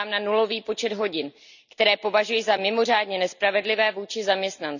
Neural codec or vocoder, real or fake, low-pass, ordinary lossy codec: none; real; 7.2 kHz; none